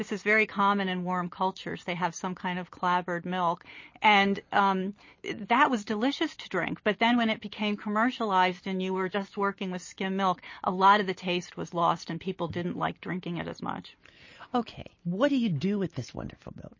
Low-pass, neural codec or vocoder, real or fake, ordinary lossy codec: 7.2 kHz; none; real; MP3, 32 kbps